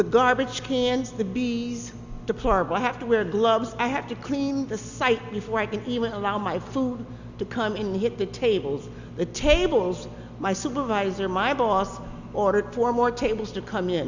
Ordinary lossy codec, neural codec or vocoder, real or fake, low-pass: Opus, 64 kbps; none; real; 7.2 kHz